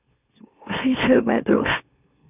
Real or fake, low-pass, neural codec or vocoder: fake; 3.6 kHz; autoencoder, 44.1 kHz, a latent of 192 numbers a frame, MeloTTS